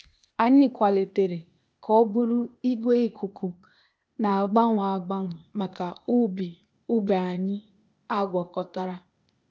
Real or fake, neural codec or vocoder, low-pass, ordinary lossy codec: fake; codec, 16 kHz, 0.8 kbps, ZipCodec; none; none